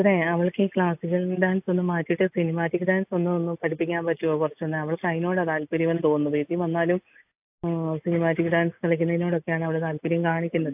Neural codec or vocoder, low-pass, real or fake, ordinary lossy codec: none; 3.6 kHz; real; AAC, 32 kbps